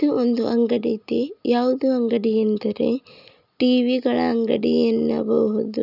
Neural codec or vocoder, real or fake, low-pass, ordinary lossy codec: none; real; 5.4 kHz; none